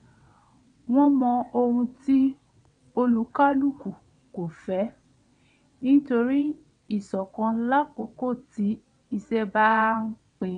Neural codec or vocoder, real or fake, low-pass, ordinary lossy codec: vocoder, 22.05 kHz, 80 mel bands, WaveNeXt; fake; 9.9 kHz; none